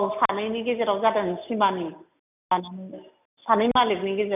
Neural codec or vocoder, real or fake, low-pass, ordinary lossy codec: none; real; 3.6 kHz; none